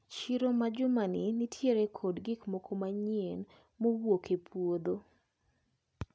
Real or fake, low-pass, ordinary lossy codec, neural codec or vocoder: real; none; none; none